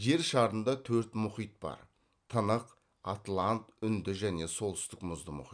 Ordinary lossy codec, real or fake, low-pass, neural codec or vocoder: none; real; 9.9 kHz; none